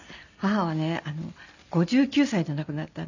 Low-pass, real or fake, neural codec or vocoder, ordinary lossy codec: 7.2 kHz; real; none; none